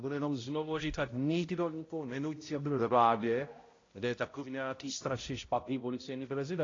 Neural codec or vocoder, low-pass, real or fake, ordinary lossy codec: codec, 16 kHz, 0.5 kbps, X-Codec, HuBERT features, trained on balanced general audio; 7.2 kHz; fake; AAC, 32 kbps